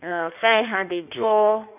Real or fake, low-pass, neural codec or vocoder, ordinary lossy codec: fake; 3.6 kHz; codec, 16 kHz in and 24 kHz out, 1.1 kbps, FireRedTTS-2 codec; none